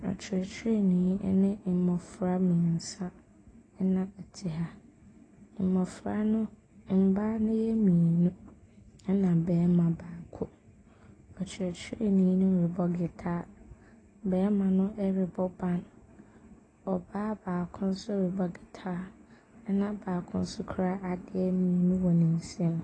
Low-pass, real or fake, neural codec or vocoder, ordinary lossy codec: 9.9 kHz; real; none; AAC, 32 kbps